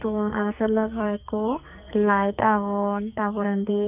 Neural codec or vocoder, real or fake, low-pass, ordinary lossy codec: codec, 44.1 kHz, 2.6 kbps, SNAC; fake; 3.6 kHz; none